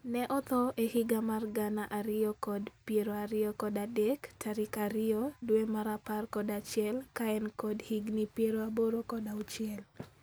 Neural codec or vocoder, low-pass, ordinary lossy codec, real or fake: none; none; none; real